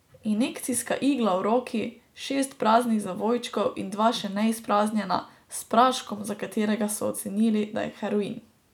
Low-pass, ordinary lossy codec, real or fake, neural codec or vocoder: 19.8 kHz; none; real; none